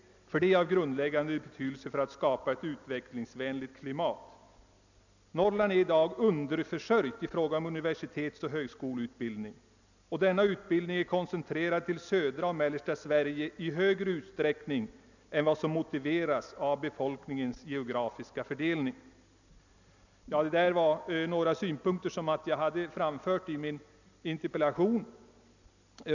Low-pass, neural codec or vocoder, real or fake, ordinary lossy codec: 7.2 kHz; none; real; none